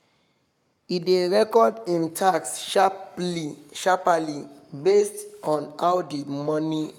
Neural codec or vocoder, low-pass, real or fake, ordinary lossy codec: codec, 44.1 kHz, 7.8 kbps, Pupu-Codec; 19.8 kHz; fake; none